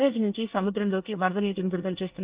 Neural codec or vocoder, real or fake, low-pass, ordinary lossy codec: codec, 24 kHz, 1 kbps, SNAC; fake; 3.6 kHz; Opus, 32 kbps